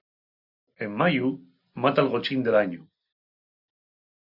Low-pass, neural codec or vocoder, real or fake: 5.4 kHz; none; real